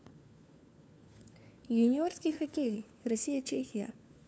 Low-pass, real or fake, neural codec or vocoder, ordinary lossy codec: none; fake; codec, 16 kHz, 4 kbps, FunCodec, trained on LibriTTS, 50 frames a second; none